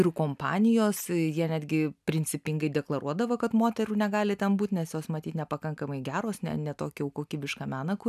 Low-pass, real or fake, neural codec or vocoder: 14.4 kHz; real; none